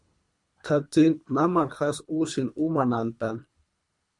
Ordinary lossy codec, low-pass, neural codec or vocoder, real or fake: MP3, 64 kbps; 10.8 kHz; codec, 24 kHz, 3 kbps, HILCodec; fake